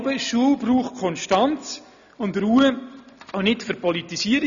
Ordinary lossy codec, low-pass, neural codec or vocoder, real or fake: none; 7.2 kHz; none; real